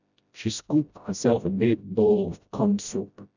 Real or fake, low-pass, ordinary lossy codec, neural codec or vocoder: fake; 7.2 kHz; none; codec, 16 kHz, 0.5 kbps, FreqCodec, smaller model